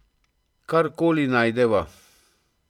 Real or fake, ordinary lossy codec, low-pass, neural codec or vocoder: fake; none; 19.8 kHz; vocoder, 44.1 kHz, 128 mel bands every 256 samples, BigVGAN v2